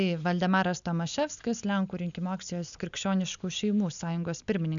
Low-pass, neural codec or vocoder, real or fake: 7.2 kHz; none; real